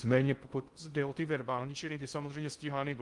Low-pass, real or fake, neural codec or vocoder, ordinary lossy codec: 10.8 kHz; fake; codec, 16 kHz in and 24 kHz out, 0.6 kbps, FocalCodec, streaming, 2048 codes; Opus, 24 kbps